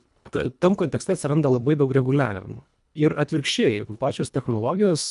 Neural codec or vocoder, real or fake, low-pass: codec, 24 kHz, 1.5 kbps, HILCodec; fake; 10.8 kHz